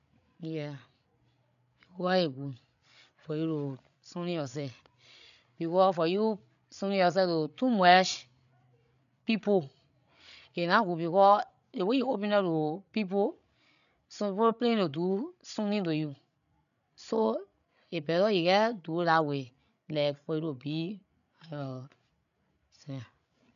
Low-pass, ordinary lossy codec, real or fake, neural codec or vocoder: 7.2 kHz; none; fake; codec, 16 kHz, 16 kbps, FreqCodec, larger model